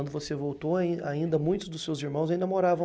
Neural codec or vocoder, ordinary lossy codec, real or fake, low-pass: none; none; real; none